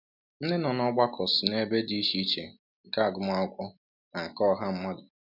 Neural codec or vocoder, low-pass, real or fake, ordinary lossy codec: none; 5.4 kHz; real; none